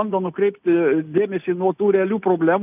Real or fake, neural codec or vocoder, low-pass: real; none; 3.6 kHz